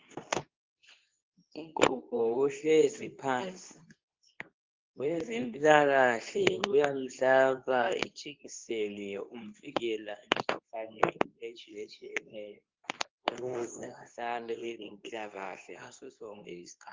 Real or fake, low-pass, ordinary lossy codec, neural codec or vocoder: fake; 7.2 kHz; Opus, 32 kbps; codec, 24 kHz, 0.9 kbps, WavTokenizer, medium speech release version 2